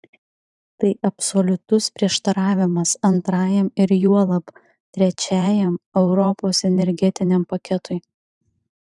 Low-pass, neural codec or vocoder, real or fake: 10.8 kHz; vocoder, 44.1 kHz, 128 mel bands every 512 samples, BigVGAN v2; fake